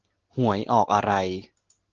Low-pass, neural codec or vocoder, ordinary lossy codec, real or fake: 7.2 kHz; none; Opus, 16 kbps; real